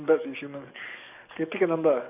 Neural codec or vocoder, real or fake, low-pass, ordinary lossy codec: codec, 44.1 kHz, 7.8 kbps, DAC; fake; 3.6 kHz; none